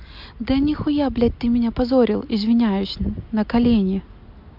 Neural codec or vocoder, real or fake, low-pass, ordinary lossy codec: none; real; 5.4 kHz; MP3, 48 kbps